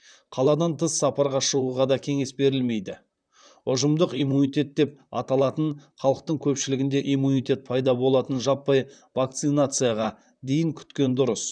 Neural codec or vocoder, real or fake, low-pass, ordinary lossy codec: vocoder, 44.1 kHz, 128 mel bands, Pupu-Vocoder; fake; 9.9 kHz; none